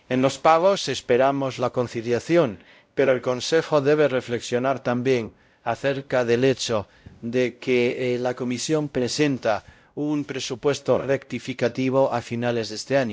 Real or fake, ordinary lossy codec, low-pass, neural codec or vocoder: fake; none; none; codec, 16 kHz, 0.5 kbps, X-Codec, WavLM features, trained on Multilingual LibriSpeech